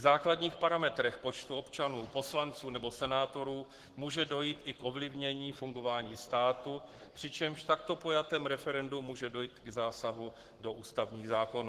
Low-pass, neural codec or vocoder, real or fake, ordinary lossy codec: 14.4 kHz; codec, 44.1 kHz, 7.8 kbps, Pupu-Codec; fake; Opus, 16 kbps